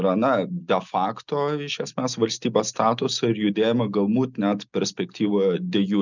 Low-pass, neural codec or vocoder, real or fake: 7.2 kHz; none; real